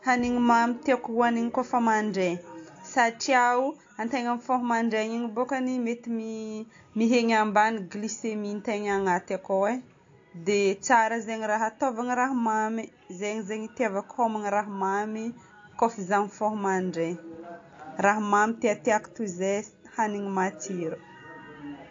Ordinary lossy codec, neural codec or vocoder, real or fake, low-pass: AAC, 48 kbps; none; real; 7.2 kHz